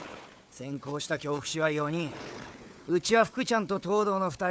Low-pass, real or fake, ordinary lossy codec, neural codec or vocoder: none; fake; none; codec, 16 kHz, 4 kbps, FunCodec, trained on Chinese and English, 50 frames a second